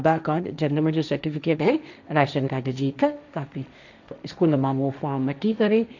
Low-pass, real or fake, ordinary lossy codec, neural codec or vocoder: 7.2 kHz; fake; none; codec, 16 kHz, 1.1 kbps, Voila-Tokenizer